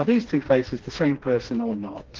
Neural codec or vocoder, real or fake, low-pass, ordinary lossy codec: codec, 16 kHz, 2 kbps, FreqCodec, smaller model; fake; 7.2 kHz; Opus, 16 kbps